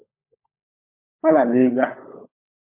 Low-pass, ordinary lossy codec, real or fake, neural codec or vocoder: 3.6 kHz; MP3, 24 kbps; fake; codec, 16 kHz, 16 kbps, FunCodec, trained on LibriTTS, 50 frames a second